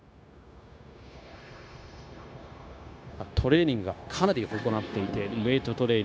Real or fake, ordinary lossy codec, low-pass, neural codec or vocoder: fake; none; none; codec, 16 kHz, 0.9 kbps, LongCat-Audio-Codec